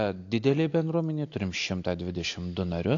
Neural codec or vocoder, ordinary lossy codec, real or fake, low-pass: none; AAC, 48 kbps; real; 7.2 kHz